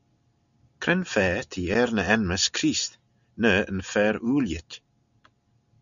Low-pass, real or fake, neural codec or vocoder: 7.2 kHz; real; none